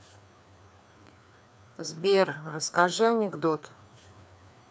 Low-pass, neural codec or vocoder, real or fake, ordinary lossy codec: none; codec, 16 kHz, 2 kbps, FreqCodec, larger model; fake; none